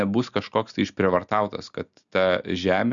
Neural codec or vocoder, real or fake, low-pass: none; real; 7.2 kHz